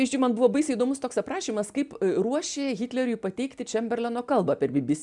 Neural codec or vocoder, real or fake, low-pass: none; real; 10.8 kHz